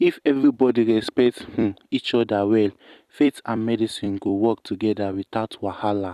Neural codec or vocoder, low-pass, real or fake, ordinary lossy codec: vocoder, 44.1 kHz, 128 mel bands every 256 samples, BigVGAN v2; 14.4 kHz; fake; none